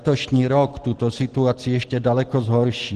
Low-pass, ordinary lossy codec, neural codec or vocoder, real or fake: 10.8 kHz; Opus, 16 kbps; none; real